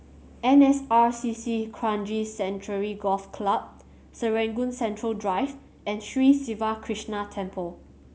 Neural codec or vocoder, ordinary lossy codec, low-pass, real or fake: none; none; none; real